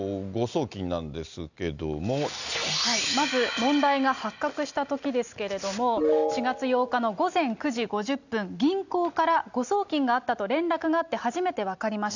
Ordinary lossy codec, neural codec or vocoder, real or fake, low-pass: none; none; real; 7.2 kHz